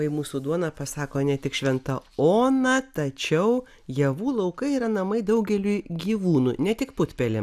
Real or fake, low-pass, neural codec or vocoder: real; 14.4 kHz; none